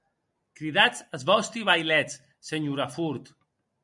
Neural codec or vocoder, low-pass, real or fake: none; 10.8 kHz; real